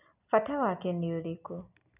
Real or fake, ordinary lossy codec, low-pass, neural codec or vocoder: real; none; 3.6 kHz; none